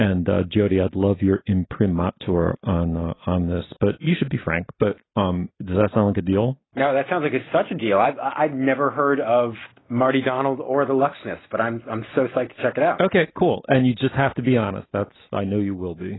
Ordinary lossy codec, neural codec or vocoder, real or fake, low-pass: AAC, 16 kbps; none; real; 7.2 kHz